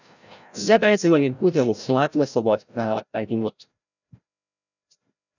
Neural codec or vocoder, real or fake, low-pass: codec, 16 kHz, 0.5 kbps, FreqCodec, larger model; fake; 7.2 kHz